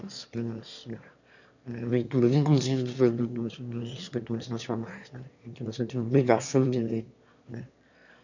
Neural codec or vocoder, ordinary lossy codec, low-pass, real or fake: autoencoder, 22.05 kHz, a latent of 192 numbers a frame, VITS, trained on one speaker; none; 7.2 kHz; fake